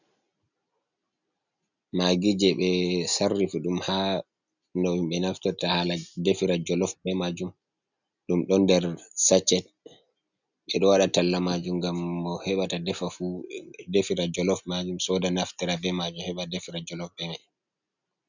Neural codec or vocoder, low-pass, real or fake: none; 7.2 kHz; real